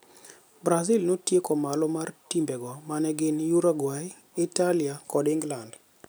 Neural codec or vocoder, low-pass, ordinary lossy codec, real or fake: none; none; none; real